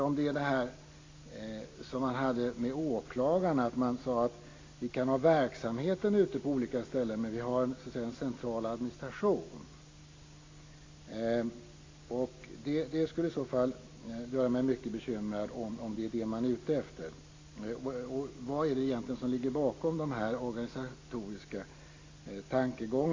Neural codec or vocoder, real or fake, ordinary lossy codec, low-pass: none; real; AAC, 32 kbps; 7.2 kHz